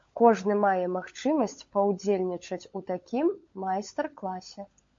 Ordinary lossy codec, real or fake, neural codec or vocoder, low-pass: MP3, 48 kbps; fake; codec, 16 kHz, 16 kbps, FunCodec, trained on LibriTTS, 50 frames a second; 7.2 kHz